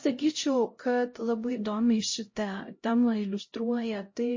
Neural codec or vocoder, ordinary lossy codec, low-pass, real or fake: codec, 16 kHz, 0.5 kbps, X-Codec, HuBERT features, trained on LibriSpeech; MP3, 32 kbps; 7.2 kHz; fake